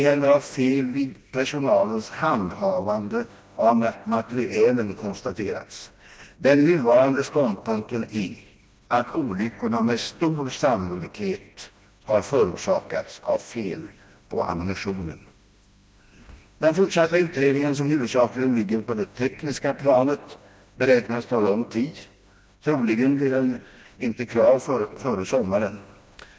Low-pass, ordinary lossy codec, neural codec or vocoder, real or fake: none; none; codec, 16 kHz, 1 kbps, FreqCodec, smaller model; fake